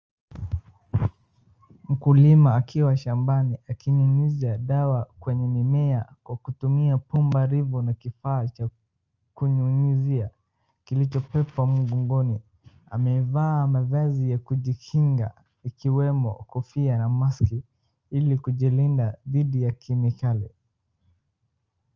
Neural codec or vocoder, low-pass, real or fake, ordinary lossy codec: none; 7.2 kHz; real; Opus, 24 kbps